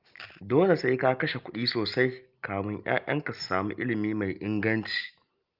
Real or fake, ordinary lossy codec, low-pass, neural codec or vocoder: real; Opus, 24 kbps; 5.4 kHz; none